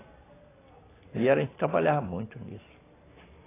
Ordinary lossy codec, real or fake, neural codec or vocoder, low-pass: AAC, 16 kbps; real; none; 3.6 kHz